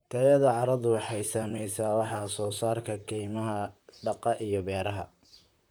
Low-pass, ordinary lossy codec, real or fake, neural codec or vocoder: none; none; fake; vocoder, 44.1 kHz, 128 mel bands, Pupu-Vocoder